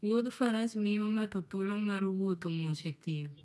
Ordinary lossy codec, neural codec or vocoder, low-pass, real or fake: none; codec, 24 kHz, 0.9 kbps, WavTokenizer, medium music audio release; none; fake